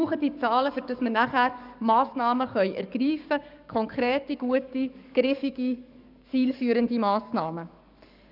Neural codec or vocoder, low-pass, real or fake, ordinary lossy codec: codec, 44.1 kHz, 7.8 kbps, DAC; 5.4 kHz; fake; none